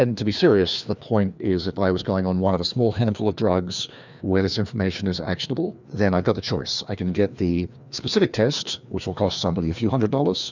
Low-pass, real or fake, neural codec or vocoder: 7.2 kHz; fake; codec, 16 kHz, 2 kbps, FreqCodec, larger model